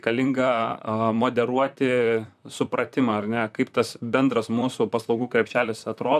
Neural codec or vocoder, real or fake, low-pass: vocoder, 44.1 kHz, 128 mel bands, Pupu-Vocoder; fake; 14.4 kHz